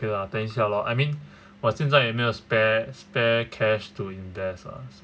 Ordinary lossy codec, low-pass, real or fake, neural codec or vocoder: none; none; real; none